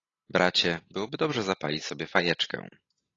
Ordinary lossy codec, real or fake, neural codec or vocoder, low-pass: AAC, 32 kbps; real; none; 7.2 kHz